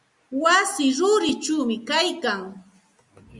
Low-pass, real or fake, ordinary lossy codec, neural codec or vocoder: 10.8 kHz; real; Opus, 64 kbps; none